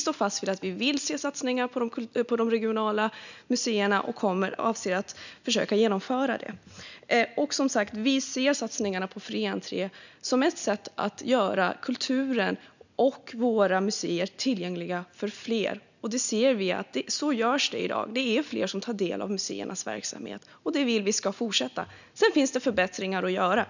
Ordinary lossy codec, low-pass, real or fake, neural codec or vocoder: none; 7.2 kHz; real; none